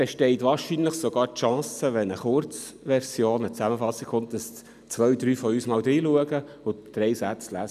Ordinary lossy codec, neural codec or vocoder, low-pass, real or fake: none; none; 14.4 kHz; real